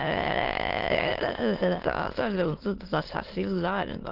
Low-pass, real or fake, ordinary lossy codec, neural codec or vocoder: 5.4 kHz; fake; Opus, 16 kbps; autoencoder, 22.05 kHz, a latent of 192 numbers a frame, VITS, trained on many speakers